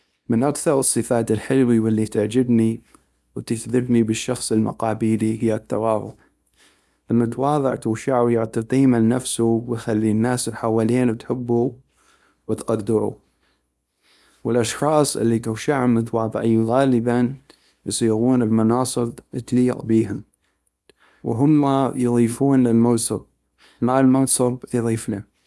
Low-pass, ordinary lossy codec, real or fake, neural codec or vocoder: none; none; fake; codec, 24 kHz, 0.9 kbps, WavTokenizer, small release